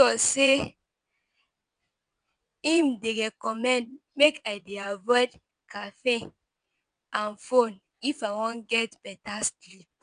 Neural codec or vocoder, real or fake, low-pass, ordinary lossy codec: vocoder, 22.05 kHz, 80 mel bands, WaveNeXt; fake; 9.9 kHz; none